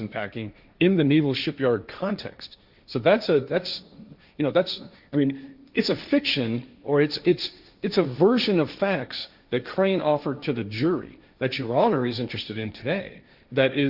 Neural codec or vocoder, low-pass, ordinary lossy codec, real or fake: codec, 16 kHz, 1.1 kbps, Voila-Tokenizer; 5.4 kHz; Opus, 64 kbps; fake